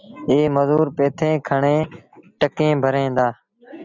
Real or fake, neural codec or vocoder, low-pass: real; none; 7.2 kHz